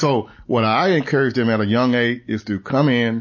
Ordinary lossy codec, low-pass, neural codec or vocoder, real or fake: MP3, 32 kbps; 7.2 kHz; none; real